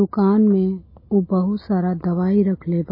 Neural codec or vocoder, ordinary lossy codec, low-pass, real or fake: none; MP3, 24 kbps; 5.4 kHz; real